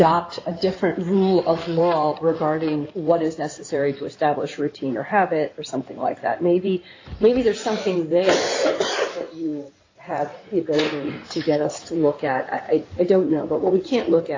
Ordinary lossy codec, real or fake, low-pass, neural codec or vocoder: AAC, 32 kbps; fake; 7.2 kHz; codec, 16 kHz in and 24 kHz out, 2.2 kbps, FireRedTTS-2 codec